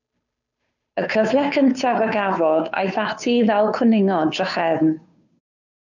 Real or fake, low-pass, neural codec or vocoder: fake; 7.2 kHz; codec, 16 kHz, 2 kbps, FunCodec, trained on Chinese and English, 25 frames a second